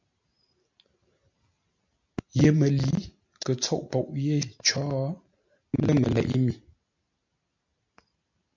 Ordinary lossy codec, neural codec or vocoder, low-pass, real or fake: MP3, 64 kbps; none; 7.2 kHz; real